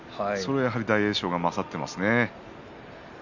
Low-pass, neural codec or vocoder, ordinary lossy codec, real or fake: 7.2 kHz; none; none; real